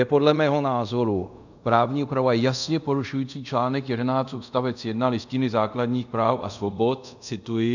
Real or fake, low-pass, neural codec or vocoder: fake; 7.2 kHz; codec, 24 kHz, 0.5 kbps, DualCodec